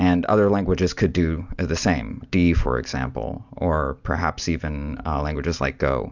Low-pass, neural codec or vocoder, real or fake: 7.2 kHz; none; real